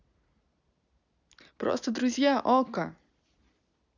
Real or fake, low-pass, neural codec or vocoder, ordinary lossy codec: real; 7.2 kHz; none; none